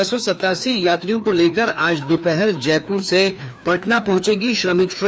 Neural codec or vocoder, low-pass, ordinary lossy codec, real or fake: codec, 16 kHz, 2 kbps, FreqCodec, larger model; none; none; fake